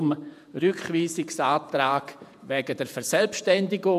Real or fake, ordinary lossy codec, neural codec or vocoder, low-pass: fake; none; vocoder, 48 kHz, 128 mel bands, Vocos; 14.4 kHz